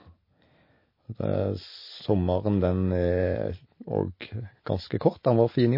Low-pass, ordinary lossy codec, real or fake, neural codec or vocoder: 5.4 kHz; MP3, 24 kbps; real; none